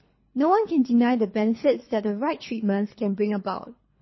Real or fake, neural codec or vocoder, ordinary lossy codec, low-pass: fake; codec, 24 kHz, 3 kbps, HILCodec; MP3, 24 kbps; 7.2 kHz